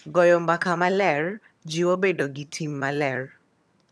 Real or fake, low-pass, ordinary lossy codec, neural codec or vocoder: fake; none; none; vocoder, 22.05 kHz, 80 mel bands, HiFi-GAN